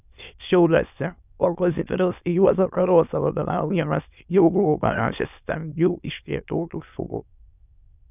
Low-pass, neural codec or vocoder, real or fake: 3.6 kHz; autoencoder, 22.05 kHz, a latent of 192 numbers a frame, VITS, trained on many speakers; fake